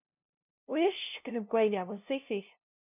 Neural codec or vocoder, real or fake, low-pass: codec, 16 kHz, 0.5 kbps, FunCodec, trained on LibriTTS, 25 frames a second; fake; 3.6 kHz